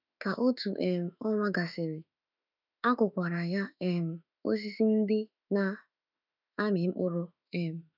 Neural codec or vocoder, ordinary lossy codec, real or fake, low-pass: autoencoder, 48 kHz, 32 numbers a frame, DAC-VAE, trained on Japanese speech; none; fake; 5.4 kHz